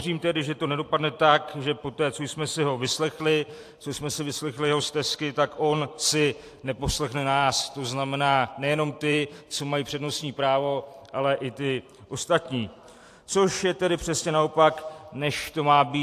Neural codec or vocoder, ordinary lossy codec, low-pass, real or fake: vocoder, 44.1 kHz, 128 mel bands every 512 samples, BigVGAN v2; AAC, 64 kbps; 14.4 kHz; fake